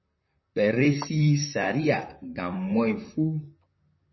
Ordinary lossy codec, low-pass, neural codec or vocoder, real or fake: MP3, 24 kbps; 7.2 kHz; vocoder, 44.1 kHz, 128 mel bands, Pupu-Vocoder; fake